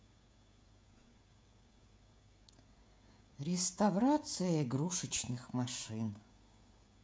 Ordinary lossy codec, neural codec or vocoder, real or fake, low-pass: none; codec, 16 kHz, 16 kbps, FreqCodec, smaller model; fake; none